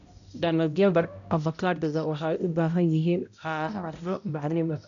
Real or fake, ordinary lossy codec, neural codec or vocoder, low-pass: fake; none; codec, 16 kHz, 0.5 kbps, X-Codec, HuBERT features, trained on balanced general audio; 7.2 kHz